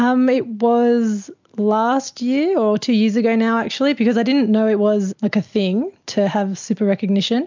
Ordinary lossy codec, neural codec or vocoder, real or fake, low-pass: MP3, 64 kbps; none; real; 7.2 kHz